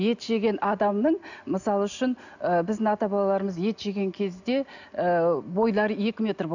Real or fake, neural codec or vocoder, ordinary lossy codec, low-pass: real; none; none; 7.2 kHz